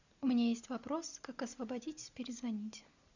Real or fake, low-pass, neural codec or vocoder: real; 7.2 kHz; none